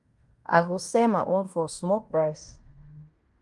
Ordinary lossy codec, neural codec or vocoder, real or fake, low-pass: Opus, 32 kbps; codec, 16 kHz in and 24 kHz out, 0.9 kbps, LongCat-Audio-Codec, fine tuned four codebook decoder; fake; 10.8 kHz